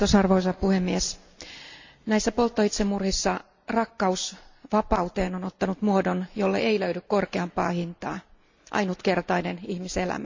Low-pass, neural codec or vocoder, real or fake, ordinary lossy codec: 7.2 kHz; none; real; MP3, 64 kbps